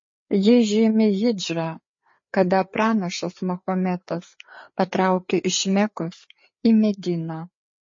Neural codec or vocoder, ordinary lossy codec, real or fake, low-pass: codec, 16 kHz, 4 kbps, FreqCodec, larger model; MP3, 32 kbps; fake; 7.2 kHz